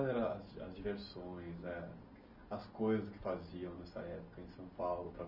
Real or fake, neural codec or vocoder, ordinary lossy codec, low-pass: real; none; none; 5.4 kHz